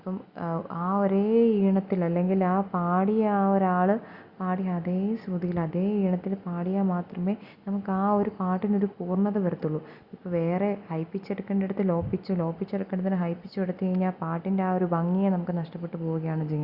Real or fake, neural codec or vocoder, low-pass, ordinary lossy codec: real; none; 5.4 kHz; Opus, 64 kbps